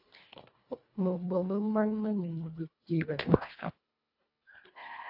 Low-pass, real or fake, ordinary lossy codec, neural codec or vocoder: 5.4 kHz; fake; AAC, 48 kbps; codec, 24 kHz, 1.5 kbps, HILCodec